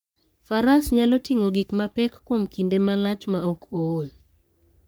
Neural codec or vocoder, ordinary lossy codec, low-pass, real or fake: codec, 44.1 kHz, 3.4 kbps, Pupu-Codec; none; none; fake